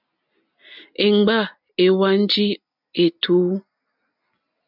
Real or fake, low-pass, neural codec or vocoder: real; 5.4 kHz; none